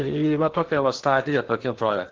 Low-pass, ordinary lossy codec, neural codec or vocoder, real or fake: 7.2 kHz; Opus, 16 kbps; codec, 16 kHz in and 24 kHz out, 0.8 kbps, FocalCodec, streaming, 65536 codes; fake